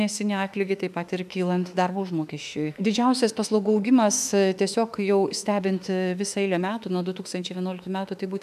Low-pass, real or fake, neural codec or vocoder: 14.4 kHz; fake; autoencoder, 48 kHz, 32 numbers a frame, DAC-VAE, trained on Japanese speech